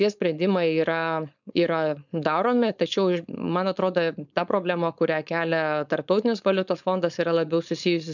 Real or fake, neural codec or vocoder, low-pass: fake; codec, 16 kHz, 4.8 kbps, FACodec; 7.2 kHz